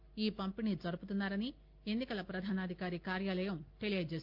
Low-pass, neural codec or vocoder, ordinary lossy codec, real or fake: 5.4 kHz; none; Opus, 32 kbps; real